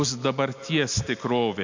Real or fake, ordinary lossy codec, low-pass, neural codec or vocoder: real; MP3, 48 kbps; 7.2 kHz; none